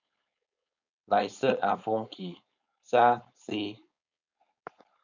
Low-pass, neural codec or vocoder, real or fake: 7.2 kHz; codec, 16 kHz, 4.8 kbps, FACodec; fake